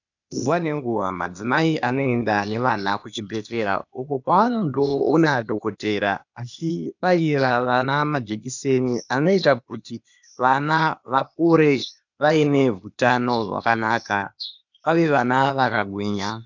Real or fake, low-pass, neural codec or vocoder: fake; 7.2 kHz; codec, 16 kHz, 0.8 kbps, ZipCodec